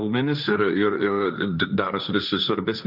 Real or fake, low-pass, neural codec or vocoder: fake; 5.4 kHz; codec, 16 kHz, 1.1 kbps, Voila-Tokenizer